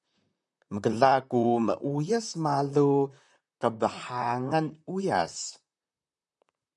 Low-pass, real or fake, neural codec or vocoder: 10.8 kHz; fake; vocoder, 44.1 kHz, 128 mel bands, Pupu-Vocoder